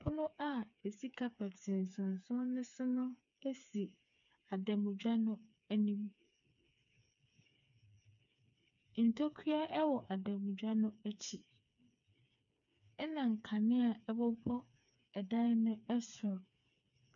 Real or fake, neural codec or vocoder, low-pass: fake; codec, 16 kHz, 4 kbps, FreqCodec, smaller model; 7.2 kHz